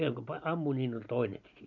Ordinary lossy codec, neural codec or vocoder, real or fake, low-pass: none; none; real; 7.2 kHz